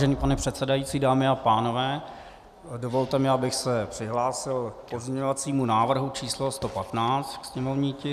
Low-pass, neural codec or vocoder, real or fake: 14.4 kHz; none; real